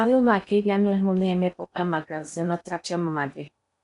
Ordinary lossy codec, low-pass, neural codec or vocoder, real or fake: none; 10.8 kHz; codec, 16 kHz in and 24 kHz out, 0.8 kbps, FocalCodec, streaming, 65536 codes; fake